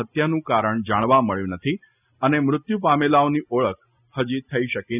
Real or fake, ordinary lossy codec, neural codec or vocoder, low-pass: real; none; none; 3.6 kHz